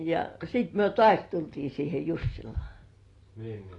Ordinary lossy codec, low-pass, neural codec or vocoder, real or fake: AAC, 32 kbps; 10.8 kHz; none; real